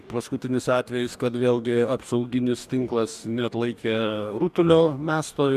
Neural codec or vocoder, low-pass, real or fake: codec, 44.1 kHz, 2.6 kbps, DAC; 14.4 kHz; fake